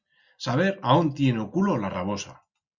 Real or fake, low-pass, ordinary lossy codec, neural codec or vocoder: real; 7.2 kHz; Opus, 64 kbps; none